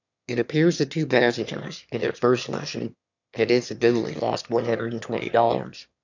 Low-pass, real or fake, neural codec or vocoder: 7.2 kHz; fake; autoencoder, 22.05 kHz, a latent of 192 numbers a frame, VITS, trained on one speaker